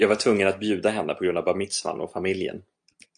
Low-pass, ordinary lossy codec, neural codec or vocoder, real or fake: 9.9 kHz; AAC, 64 kbps; none; real